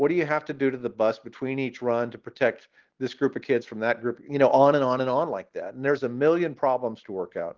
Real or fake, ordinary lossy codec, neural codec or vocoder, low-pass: real; Opus, 16 kbps; none; 7.2 kHz